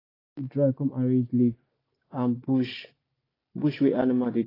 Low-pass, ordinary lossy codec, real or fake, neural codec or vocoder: 5.4 kHz; AAC, 24 kbps; real; none